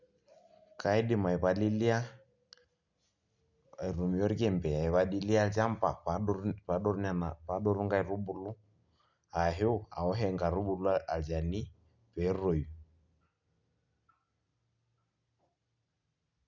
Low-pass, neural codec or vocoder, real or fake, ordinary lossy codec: 7.2 kHz; none; real; none